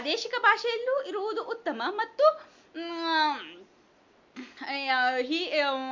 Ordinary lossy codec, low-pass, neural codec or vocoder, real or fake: MP3, 48 kbps; 7.2 kHz; none; real